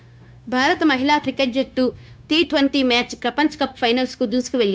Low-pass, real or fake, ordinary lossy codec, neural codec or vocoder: none; fake; none; codec, 16 kHz, 0.9 kbps, LongCat-Audio-Codec